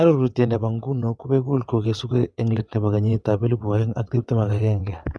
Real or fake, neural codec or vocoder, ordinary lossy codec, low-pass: fake; vocoder, 22.05 kHz, 80 mel bands, WaveNeXt; none; none